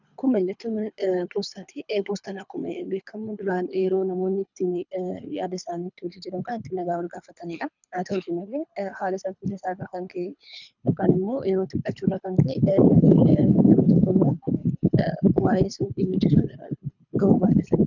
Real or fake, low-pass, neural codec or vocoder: fake; 7.2 kHz; codec, 24 kHz, 3 kbps, HILCodec